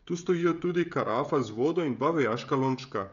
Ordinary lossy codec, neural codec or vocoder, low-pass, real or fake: none; codec, 16 kHz, 16 kbps, FreqCodec, smaller model; 7.2 kHz; fake